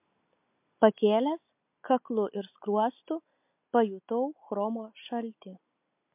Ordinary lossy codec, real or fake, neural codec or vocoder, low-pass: MP3, 32 kbps; real; none; 3.6 kHz